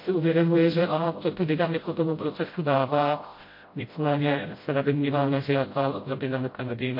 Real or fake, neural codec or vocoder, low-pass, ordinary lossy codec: fake; codec, 16 kHz, 0.5 kbps, FreqCodec, smaller model; 5.4 kHz; MP3, 32 kbps